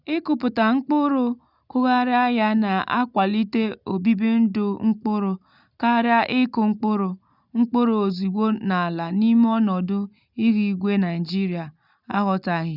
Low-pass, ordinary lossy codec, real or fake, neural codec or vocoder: 5.4 kHz; none; real; none